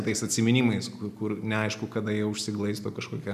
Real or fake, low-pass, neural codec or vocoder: real; 14.4 kHz; none